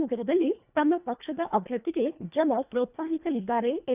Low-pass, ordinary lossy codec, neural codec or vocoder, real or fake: 3.6 kHz; none; codec, 24 kHz, 1.5 kbps, HILCodec; fake